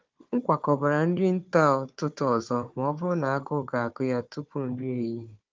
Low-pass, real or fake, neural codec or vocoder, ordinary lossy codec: 7.2 kHz; fake; codec, 16 kHz, 4 kbps, FunCodec, trained on Chinese and English, 50 frames a second; Opus, 32 kbps